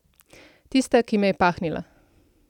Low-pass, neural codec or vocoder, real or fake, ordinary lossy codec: 19.8 kHz; none; real; none